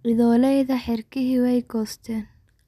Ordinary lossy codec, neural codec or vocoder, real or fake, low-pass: none; none; real; 14.4 kHz